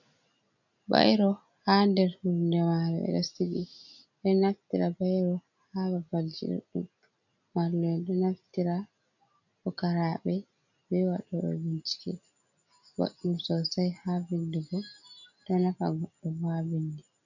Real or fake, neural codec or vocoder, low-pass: real; none; 7.2 kHz